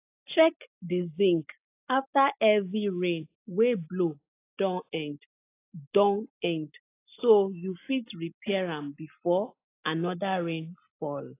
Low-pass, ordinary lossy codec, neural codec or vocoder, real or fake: 3.6 kHz; AAC, 24 kbps; none; real